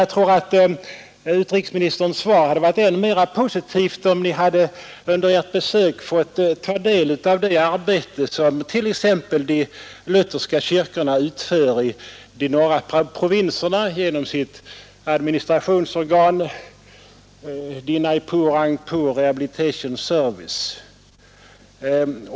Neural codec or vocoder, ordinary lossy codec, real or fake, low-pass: none; none; real; none